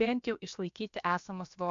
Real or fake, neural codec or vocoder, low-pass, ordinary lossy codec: fake; codec, 16 kHz, about 1 kbps, DyCAST, with the encoder's durations; 7.2 kHz; AAC, 64 kbps